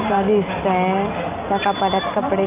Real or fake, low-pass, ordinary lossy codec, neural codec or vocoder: real; 3.6 kHz; Opus, 24 kbps; none